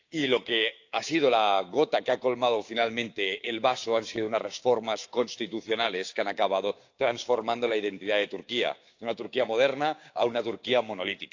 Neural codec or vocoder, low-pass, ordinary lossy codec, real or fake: codec, 16 kHz, 6 kbps, DAC; 7.2 kHz; AAC, 48 kbps; fake